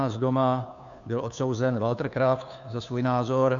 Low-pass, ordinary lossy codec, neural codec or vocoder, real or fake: 7.2 kHz; MP3, 96 kbps; codec, 16 kHz, 2 kbps, FunCodec, trained on Chinese and English, 25 frames a second; fake